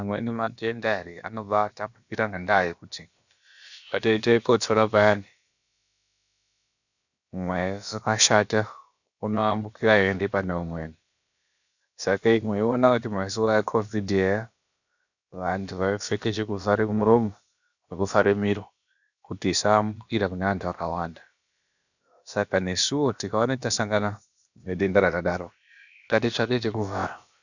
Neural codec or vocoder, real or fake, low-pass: codec, 16 kHz, about 1 kbps, DyCAST, with the encoder's durations; fake; 7.2 kHz